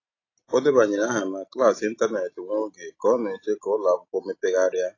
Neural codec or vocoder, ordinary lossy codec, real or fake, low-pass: none; AAC, 32 kbps; real; 7.2 kHz